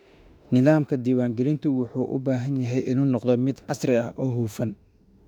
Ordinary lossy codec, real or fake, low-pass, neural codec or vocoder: none; fake; 19.8 kHz; autoencoder, 48 kHz, 32 numbers a frame, DAC-VAE, trained on Japanese speech